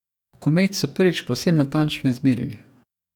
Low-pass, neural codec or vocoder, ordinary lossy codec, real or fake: 19.8 kHz; codec, 44.1 kHz, 2.6 kbps, DAC; none; fake